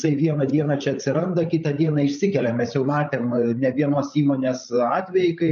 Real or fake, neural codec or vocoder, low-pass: fake; codec, 16 kHz, 8 kbps, FreqCodec, larger model; 7.2 kHz